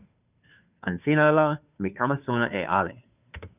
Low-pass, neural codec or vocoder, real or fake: 3.6 kHz; codec, 16 kHz, 2 kbps, FunCodec, trained on Chinese and English, 25 frames a second; fake